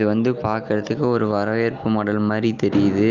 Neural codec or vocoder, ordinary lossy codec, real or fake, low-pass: none; Opus, 24 kbps; real; 7.2 kHz